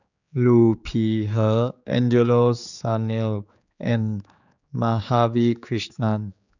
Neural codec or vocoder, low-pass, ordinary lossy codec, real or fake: codec, 16 kHz, 4 kbps, X-Codec, HuBERT features, trained on general audio; 7.2 kHz; none; fake